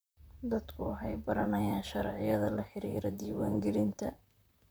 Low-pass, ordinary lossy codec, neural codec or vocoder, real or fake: none; none; vocoder, 44.1 kHz, 128 mel bands, Pupu-Vocoder; fake